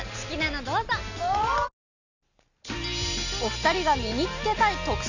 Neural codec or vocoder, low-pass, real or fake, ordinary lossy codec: none; 7.2 kHz; real; none